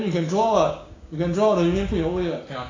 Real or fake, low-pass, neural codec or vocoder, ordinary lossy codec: fake; 7.2 kHz; codec, 16 kHz, 6 kbps, DAC; AAC, 32 kbps